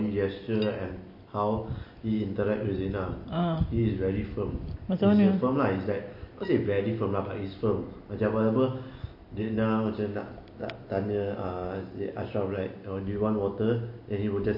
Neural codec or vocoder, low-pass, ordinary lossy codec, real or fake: none; 5.4 kHz; MP3, 32 kbps; real